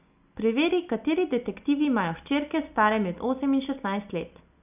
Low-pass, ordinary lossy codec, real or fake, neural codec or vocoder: 3.6 kHz; none; real; none